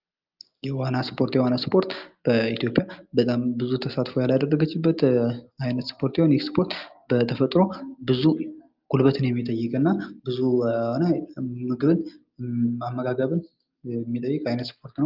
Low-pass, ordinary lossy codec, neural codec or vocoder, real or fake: 5.4 kHz; Opus, 32 kbps; none; real